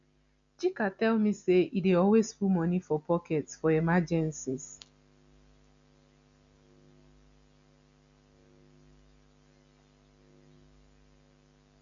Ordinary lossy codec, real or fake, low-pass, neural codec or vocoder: none; real; 7.2 kHz; none